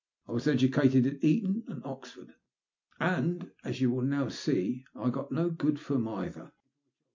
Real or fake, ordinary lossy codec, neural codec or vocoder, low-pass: real; MP3, 48 kbps; none; 7.2 kHz